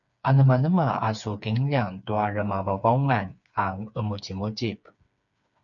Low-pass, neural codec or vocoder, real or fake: 7.2 kHz; codec, 16 kHz, 4 kbps, FreqCodec, smaller model; fake